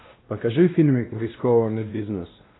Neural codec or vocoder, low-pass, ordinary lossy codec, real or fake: codec, 16 kHz, 1 kbps, X-Codec, WavLM features, trained on Multilingual LibriSpeech; 7.2 kHz; AAC, 16 kbps; fake